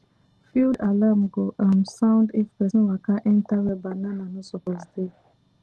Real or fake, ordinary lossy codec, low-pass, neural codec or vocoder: real; Opus, 16 kbps; 10.8 kHz; none